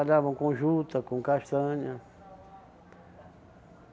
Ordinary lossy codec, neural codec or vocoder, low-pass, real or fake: none; none; none; real